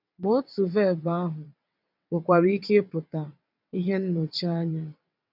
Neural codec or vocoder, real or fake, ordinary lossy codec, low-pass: vocoder, 22.05 kHz, 80 mel bands, WaveNeXt; fake; none; 5.4 kHz